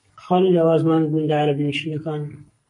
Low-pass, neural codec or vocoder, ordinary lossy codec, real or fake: 10.8 kHz; codec, 44.1 kHz, 2.6 kbps, SNAC; MP3, 48 kbps; fake